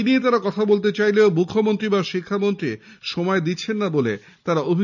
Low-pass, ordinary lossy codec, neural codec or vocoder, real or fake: 7.2 kHz; none; none; real